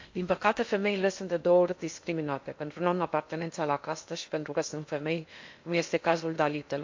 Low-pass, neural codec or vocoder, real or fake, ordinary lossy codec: 7.2 kHz; codec, 16 kHz in and 24 kHz out, 0.8 kbps, FocalCodec, streaming, 65536 codes; fake; MP3, 48 kbps